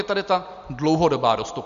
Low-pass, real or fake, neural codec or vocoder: 7.2 kHz; real; none